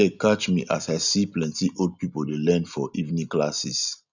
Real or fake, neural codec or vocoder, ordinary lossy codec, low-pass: real; none; none; 7.2 kHz